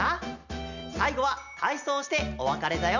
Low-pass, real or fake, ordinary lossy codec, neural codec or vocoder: 7.2 kHz; real; none; none